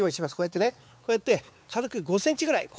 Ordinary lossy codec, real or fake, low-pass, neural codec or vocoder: none; fake; none; codec, 16 kHz, 4 kbps, X-Codec, WavLM features, trained on Multilingual LibriSpeech